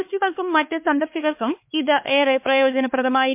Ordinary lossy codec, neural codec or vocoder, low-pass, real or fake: MP3, 32 kbps; codec, 16 kHz, 2 kbps, X-Codec, HuBERT features, trained on LibriSpeech; 3.6 kHz; fake